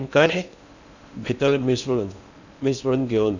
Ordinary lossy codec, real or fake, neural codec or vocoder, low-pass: none; fake; codec, 16 kHz in and 24 kHz out, 0.8 kbps, FocalCodec, streaming, 65536 codes; 7.2 kHz